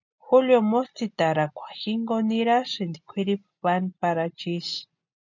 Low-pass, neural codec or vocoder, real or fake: 7.2 kHz; none; real